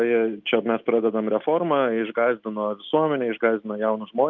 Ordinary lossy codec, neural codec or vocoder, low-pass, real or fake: Opus, 24 kbps; none; 7.2 kHz; real